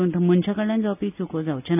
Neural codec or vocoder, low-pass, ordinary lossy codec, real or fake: none; 3.6 kHz; none; real